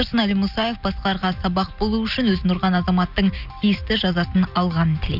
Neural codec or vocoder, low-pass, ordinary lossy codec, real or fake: none; 5.4 kHz; none; real